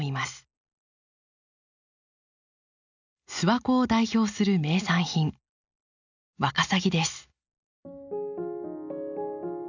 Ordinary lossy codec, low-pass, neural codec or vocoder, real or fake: none; 7.2 kHz; none; real